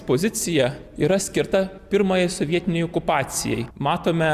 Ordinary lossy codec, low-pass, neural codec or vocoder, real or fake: Opus, 64 kbps; 14.4 kHz; none; real